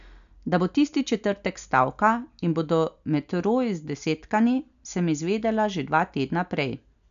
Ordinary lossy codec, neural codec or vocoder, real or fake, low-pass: none; none; real; 7.2 kHz